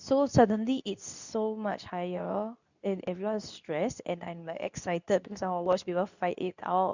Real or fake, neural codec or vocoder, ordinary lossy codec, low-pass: fake; codec, 24 kHz, 0.9 kbps, WavTokenizer, medium speech release version 2; none; 7.2 kHz